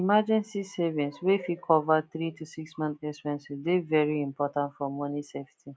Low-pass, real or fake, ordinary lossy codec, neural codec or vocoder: none; real; none; none